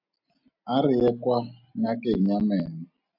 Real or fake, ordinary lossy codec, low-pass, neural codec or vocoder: real; AAC, 48 kbps; 5.4 kHz; none